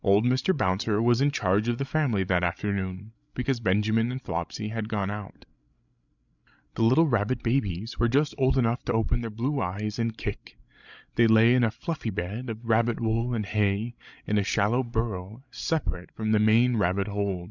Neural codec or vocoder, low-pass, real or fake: codec, 16 kHz, 16 kbps, FreqCodec, larger model; 7.2 kHz; fake